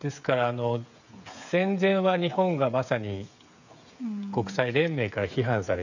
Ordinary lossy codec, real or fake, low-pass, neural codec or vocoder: none; fake; 7.2 kHz; codec, 16 kHz, 8 kbps, FreqCodec, smaller model